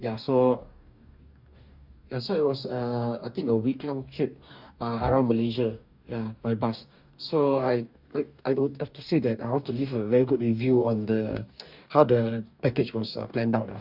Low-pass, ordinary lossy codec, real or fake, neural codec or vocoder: 5.4 kHz; none; fake; codec, 44.1 kHz, 2.6 kbps, DAC